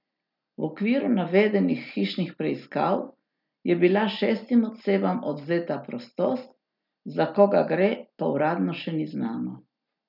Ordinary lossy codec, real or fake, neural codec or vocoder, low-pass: none; real; none; 5.4 kHz